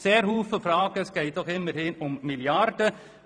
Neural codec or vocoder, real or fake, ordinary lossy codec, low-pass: vocoder, 44.1 kHz, 128 mel bands every 512 samples, BigVGAN v2; fake; none; 9.9 kHz